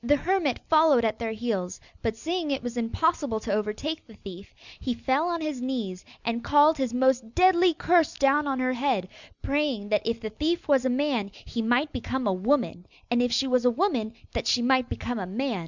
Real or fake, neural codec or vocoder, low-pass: real; none; 7.2 kHz